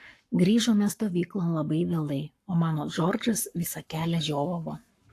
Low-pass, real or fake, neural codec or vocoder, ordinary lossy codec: 14.4 kHz; fake; codec, 44.1 kHz, 7.8 kbps, Pupu-Codec; AAC, 64 kbps